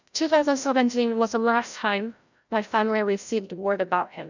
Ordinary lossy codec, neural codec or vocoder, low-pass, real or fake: none; codec, 16 kHz, 0.5 kbps, FreqCodec, larger model; 7.2 kHz; fake